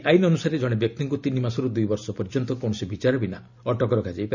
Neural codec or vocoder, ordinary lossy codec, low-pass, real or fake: none; none; 7.2 kHz; real